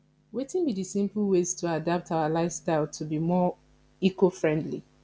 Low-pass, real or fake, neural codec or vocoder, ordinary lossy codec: none; real; none; none